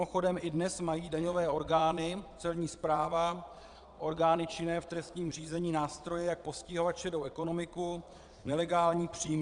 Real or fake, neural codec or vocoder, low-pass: fake; vocoder, 22.05 kHz, 80 mel bands, Vocos; 9.9 kHz